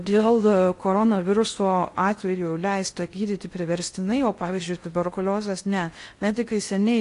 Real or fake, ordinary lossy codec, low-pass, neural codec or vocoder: fake; AAC, 48 kbps; 10.8 kHz; codec, 16 kHz in and 24 kHz out, 0.6 kbps, FocalCodec, streaming, 4096 codes